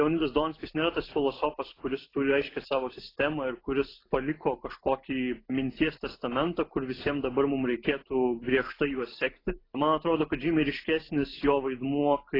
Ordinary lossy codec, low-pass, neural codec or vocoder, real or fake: AAC, 24 kbps; 5.4 kHz; none; real